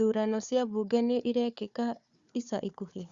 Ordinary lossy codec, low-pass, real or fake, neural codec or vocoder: Opus, 64 kbps; 7.2 kHz; fake; codec, 16 kHz, 4 kbps, FunCodec, trained on Chinese and English, 50 frames a second